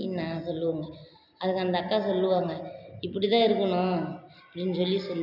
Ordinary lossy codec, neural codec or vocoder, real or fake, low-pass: none; none; real; 5.4 kHz